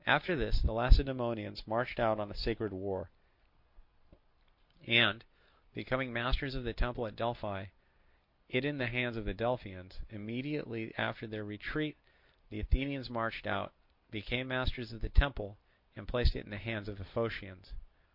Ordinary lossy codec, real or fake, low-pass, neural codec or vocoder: Opus, 64 kbps; real; 5.4 kHz; none